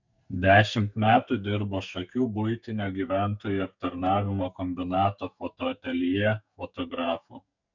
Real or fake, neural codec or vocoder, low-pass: fake; codec, 44.1 kHz, 2.6 kbps, SNAC; 7.2 kHz